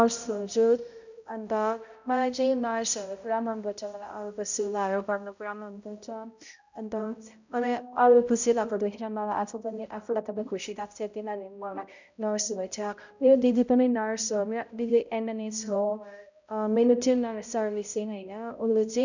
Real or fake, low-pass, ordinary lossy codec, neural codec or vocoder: fake; 7.2 kHz; none; codec, 16 kHz, 0.5 kbps, X-Codec, HuBERT features, trained on balanced general audio